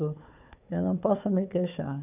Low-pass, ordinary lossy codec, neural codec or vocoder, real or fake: 3.6 kHz; none; codec, 16 kHz, 4 kbps, FunCodec, trained on Chinese and English, 50 frames a second; fake